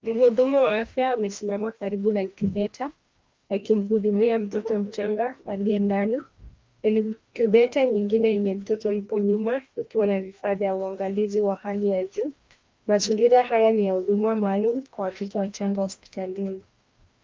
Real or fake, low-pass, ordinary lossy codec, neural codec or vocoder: fake; 7.2 kHz; Opus, 24 kbps; codec, 16 kHz, 1 kbps, FreqCodec, larger model